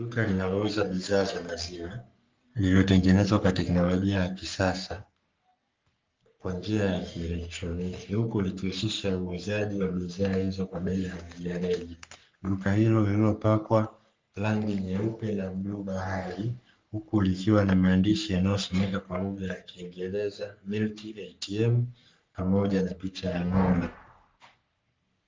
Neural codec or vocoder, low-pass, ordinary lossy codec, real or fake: codec, 44.1 kHz, 3.4 kbps, Pupu-Codec; 7.2 kHz; Opus, 24 kbps; fake